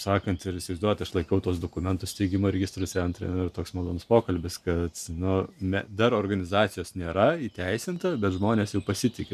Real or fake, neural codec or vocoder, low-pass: real; none; 14.4 kHz